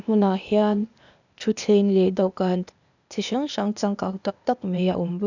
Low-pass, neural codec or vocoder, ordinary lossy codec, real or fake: 7.2 kHz; codec, 16 kHz, 0.8 kbps, ZipCodec; none; fake